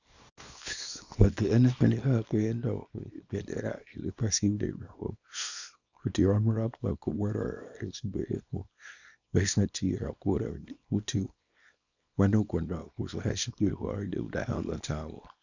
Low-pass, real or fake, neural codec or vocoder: 7.2 kHz; fake; codec, 24 kHz, 0.9 kbps, WavTokenizer, small release